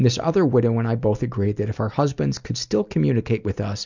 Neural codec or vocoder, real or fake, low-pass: none; real; 7.2 kHz